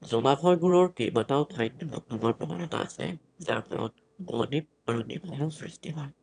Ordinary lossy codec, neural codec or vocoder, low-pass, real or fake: none; autoencoder, 22.05 kHz, a latent of 192 numbers a frame, VITS, trained on one speaker; 9.9 kHz; fake